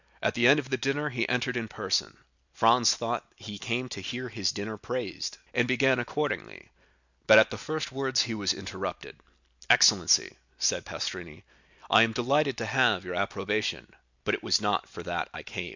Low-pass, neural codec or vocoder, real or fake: 7.2 kHz; none; real